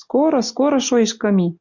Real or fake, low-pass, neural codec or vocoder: real; 7.2 kHz; none